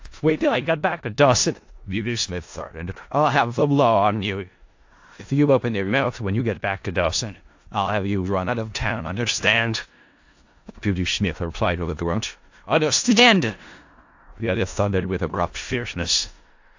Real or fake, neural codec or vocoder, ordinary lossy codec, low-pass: fake; codec, 16 kHz in and 24 kHz out, 0.4 kbps, LongCat-Audio-Codec, four codebook decoder; AAC, 48 kbps; 7.2 kHz